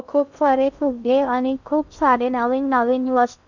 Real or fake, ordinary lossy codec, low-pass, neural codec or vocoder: fake; none; 7.2 kHz; codec, 16 kHz in and 24 kHz out, 0.6 kbps, FocalCodec, streaming, 2048 codes